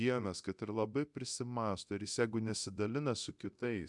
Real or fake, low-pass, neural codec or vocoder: fake; 10.8 kHz; codec, 24 kHz, 0.9 kbps, DualCodec